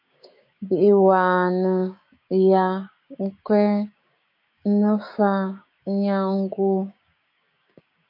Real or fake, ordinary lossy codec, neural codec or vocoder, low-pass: fake; MP3, 32 kbps; codec, 16 kHz, 6 kbps, DAC; 5.4 kHz